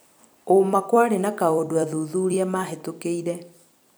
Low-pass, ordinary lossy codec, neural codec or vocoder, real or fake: none; none; vocoder, 44.1 kHz, 128 mel bands every 256 samples, BigVGAN v2; fake